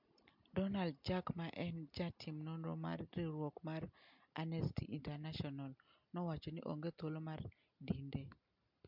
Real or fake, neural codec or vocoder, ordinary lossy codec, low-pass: real; none; MP3, 48 kbps; 5.4 kHz